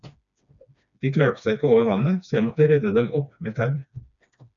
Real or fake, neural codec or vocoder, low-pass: fake; codec, 16 kHz, 2 kbps, FreqCodec, smaller model; 7.2 kHz